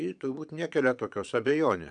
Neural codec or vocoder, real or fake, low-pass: vocoder, 22.05 kHz, 80 mel bands, Vocos; fake; 9.9 kHz